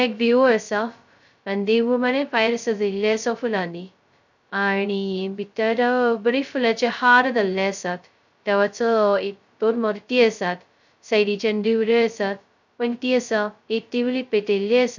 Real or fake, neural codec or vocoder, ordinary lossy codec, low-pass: fake; codec, 16 kHz, 0.2 kbps, FocalCodec; none; 7.2 kHz